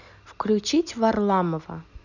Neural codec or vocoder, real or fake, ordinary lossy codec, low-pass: none; real; none; 7.2 kHz